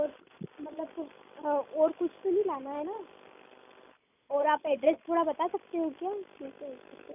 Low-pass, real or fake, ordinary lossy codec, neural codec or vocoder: 3.6 kHz; real; none; none